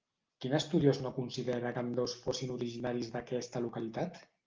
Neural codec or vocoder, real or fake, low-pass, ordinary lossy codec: none; real; 7.2 kHz; Opus, 24 kbps